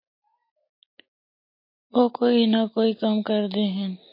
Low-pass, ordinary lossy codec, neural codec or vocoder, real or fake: 5.4 kHz; MP3, 32 kbps; none; real